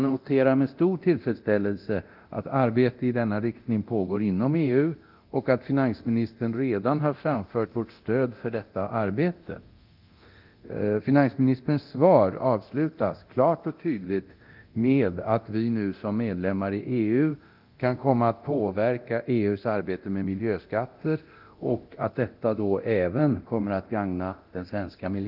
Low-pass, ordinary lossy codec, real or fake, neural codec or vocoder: 5.4 kHz; Opus, 32 kbps; fake; codec, 24 kHz, 0.9 kbps, DualCodec